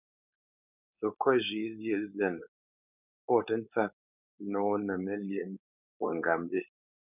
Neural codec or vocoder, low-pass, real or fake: codec, 16 kHz, 4.8 kbps, FACodec; 3.6 kHz; fake